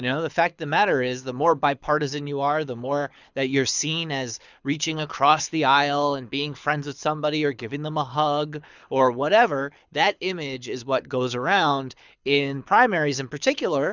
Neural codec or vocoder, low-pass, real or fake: codec, 24 kHz, 6 kbps, HILCodec; 7.2 kHz; fake